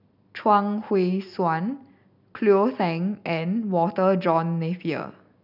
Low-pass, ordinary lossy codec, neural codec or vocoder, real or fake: 5.4 kHz; none; none; real